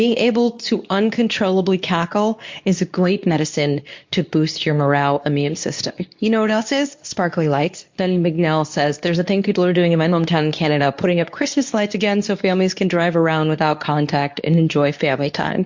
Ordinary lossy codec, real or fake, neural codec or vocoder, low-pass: MP3, 48 kbps; fake; codec, 24 kHz, 0.9 kbps, WavTokenizer, medium speech release version 2; 7.2 kHz